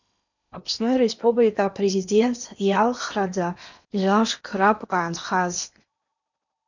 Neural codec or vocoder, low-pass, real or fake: codec, 16 kHz in and 24 kHz out, 0.8 kbps, FocalCodec, streaming, 65536 codes; 7.2 kHz; fake